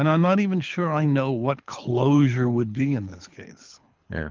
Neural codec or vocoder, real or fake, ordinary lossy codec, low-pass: codec, 24 kHz, 6 kbps, HILCodec; fake; Opus, 32 kbps; 7.2 kHz